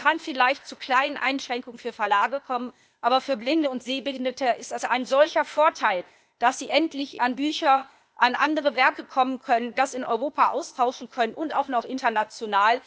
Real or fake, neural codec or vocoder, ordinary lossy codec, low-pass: fake; codec, 16 kHz, 0.8 kbps, ZipCodec; none; none